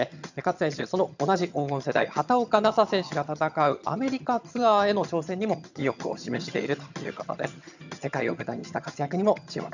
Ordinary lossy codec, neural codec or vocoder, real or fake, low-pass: none; vocoder, 22.05 kHz, 80 mel bands, HiFi-GAN; fake; 7.2 kHz